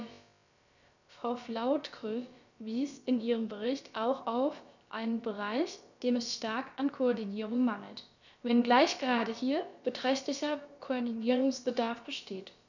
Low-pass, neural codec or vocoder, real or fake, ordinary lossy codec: 7.2 kHz; codec, 16 kHz, about 1 kbps, DyCAST, with the encoder's durations; fake; none